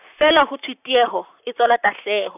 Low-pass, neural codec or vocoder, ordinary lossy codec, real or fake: 3.6 kHz; vocoder, 44.1 kHz, 128 mel bands, Pupu-Vocoder; none; fake